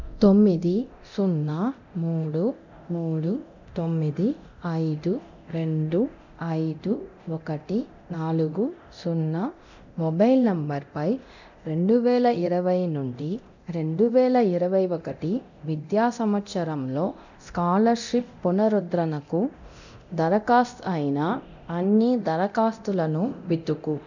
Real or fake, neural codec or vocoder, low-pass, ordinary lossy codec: fake; codec, 24 kHz, 0.9 kbps, DualCodec; 7.2 kHz; none